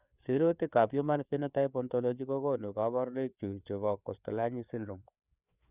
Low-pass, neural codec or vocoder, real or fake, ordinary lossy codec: 3.6 kHz; codec, 16 kHz, 4 kbps, FreqCodec, larger model; fake; Opus, 64 kbps